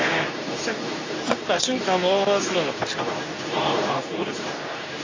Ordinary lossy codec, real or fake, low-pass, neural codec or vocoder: AAC, 32 kbps; fake; 7.2 kHz; codec, 24 kHz, 0.9 kbps, WavTokenizer, medium speech release version 2